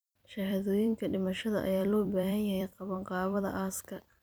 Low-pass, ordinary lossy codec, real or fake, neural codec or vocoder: none; none; fake; vocoder, 44.1 kHz, 128 mel bands every 256 samples, BigVGAN v2